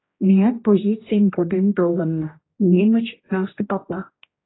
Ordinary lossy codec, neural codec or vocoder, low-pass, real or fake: AAC, 16 kbps; codec, 16 kHz, 1 kbps, X-Codec, HuBERT features, trained on general audio; 7.2 kHz; fake